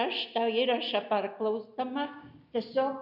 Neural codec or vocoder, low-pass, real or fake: none; 5.4 kHz; real